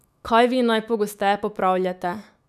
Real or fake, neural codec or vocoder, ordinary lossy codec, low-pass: fake; autoencoder, 48 kHz, 128 numbers a frame, DAC-VAE, trained on Japanese speech; none; 14.4 kHz